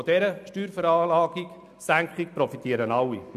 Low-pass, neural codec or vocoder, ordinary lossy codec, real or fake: 14.4 kHz; none; none; real